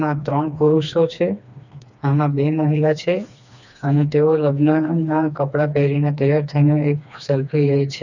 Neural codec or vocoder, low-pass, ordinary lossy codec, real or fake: codec, 16 kHz, 2 kbps, FreqCodec, smaller model; 7.2 kHz; none; fake